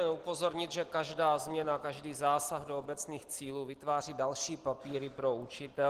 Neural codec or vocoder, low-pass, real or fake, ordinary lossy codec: none; 14.4 kHz; real; Opus, 16 kbps